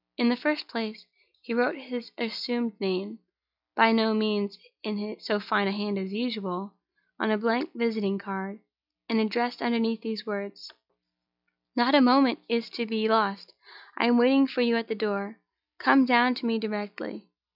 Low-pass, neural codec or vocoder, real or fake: 5.4 kHz; none; real